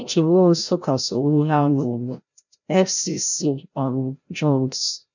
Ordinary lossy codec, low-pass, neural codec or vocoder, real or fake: none; 7.2 kHz; codec, 16 kHz, 0.5 kbps, FreqCodec, larger model; fake